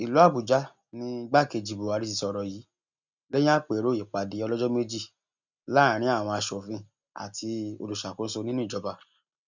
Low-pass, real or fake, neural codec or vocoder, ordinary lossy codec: 7.2 kHz; real; none; none